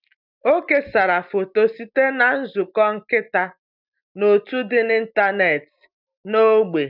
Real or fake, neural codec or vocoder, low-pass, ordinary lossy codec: real; none; 5.4 kHz; none